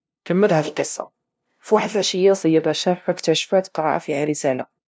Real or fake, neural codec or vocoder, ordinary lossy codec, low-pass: fake; codec, 16 kHz, 0.5 kbps, FunCodec, trained on LibriTTS, 25 frames a second; none; none